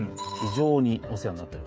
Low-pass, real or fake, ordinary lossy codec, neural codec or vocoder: none; fake; none; codec, 16 kHz, 16 kbps, FreqCodec, smaller model